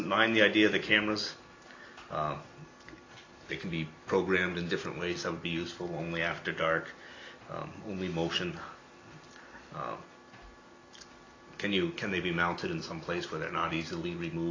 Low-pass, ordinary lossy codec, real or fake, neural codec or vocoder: 7.2 kHz; AAC, 32 kbps; real; none